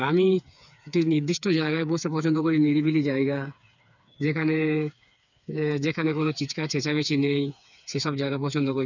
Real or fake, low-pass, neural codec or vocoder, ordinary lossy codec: fake; 7.2 kHz; codec, 16 kHz, 4 kbps, FreqCodec, smaller model; none